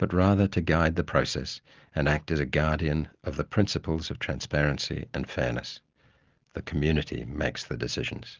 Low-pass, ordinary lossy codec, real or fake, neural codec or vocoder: 7.2 kHz; Opus, 32 kbps; real; none